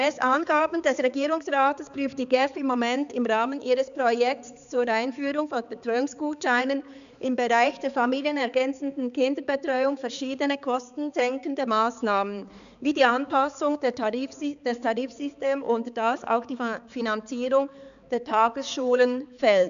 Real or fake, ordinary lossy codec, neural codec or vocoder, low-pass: fake; none; codec, 16 kHz, 4 kbps, X-Codec, HuBERT features, trained on balanced general audio; 7.2 kHz